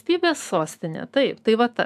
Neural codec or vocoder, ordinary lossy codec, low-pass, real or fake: autoencoder, 48 kHz, 128 numbers a frame, DAC-VAE, trained on Japanese speech; AAC, 96 kbps; 14.4 kHz; fake